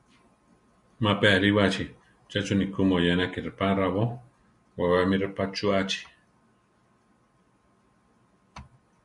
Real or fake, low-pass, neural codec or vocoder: real; 10.8 kHz; none